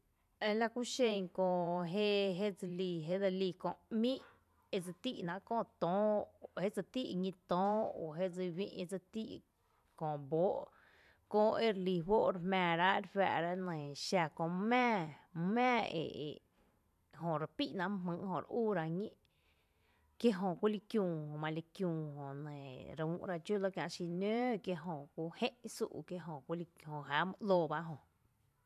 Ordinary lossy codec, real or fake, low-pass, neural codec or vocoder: none; fake; 14.4 kHz; vocoder, 44.1 kHz, 128 mel bands every 512 samples, BigVGAN v2